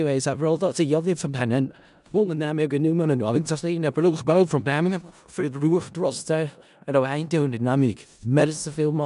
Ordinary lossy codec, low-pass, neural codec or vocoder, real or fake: none; 10.8 kHz; codec, 16 kHz in and 24 kHz out, 0.4 kbps, LongCat-Audio-Codec, four codebook decoder; fake